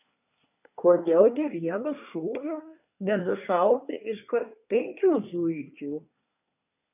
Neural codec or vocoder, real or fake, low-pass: codec, 24 kHz, 1 kbps, SNAC; fake; 3.6 kHz